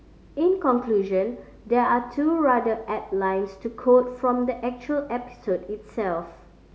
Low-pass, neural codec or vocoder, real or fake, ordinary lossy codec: none; none; real; none